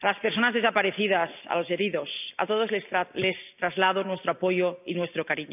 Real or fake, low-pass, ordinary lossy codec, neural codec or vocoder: real; 3.6 kHz; none; none